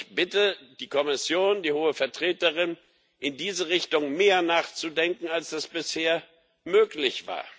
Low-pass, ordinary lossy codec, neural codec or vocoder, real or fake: none; none; none; real